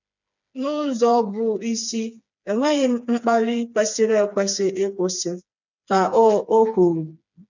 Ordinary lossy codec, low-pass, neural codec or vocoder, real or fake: none; 7.2 kHz; codec, 16 kHz, 4 kbps, FreqCodec, smaller model; fake